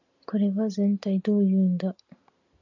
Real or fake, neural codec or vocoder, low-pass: real; none; 7.2 kHz